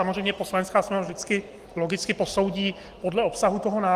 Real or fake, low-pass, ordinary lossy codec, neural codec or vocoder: real; 14.4 kHz; Opus, 24 kbps; none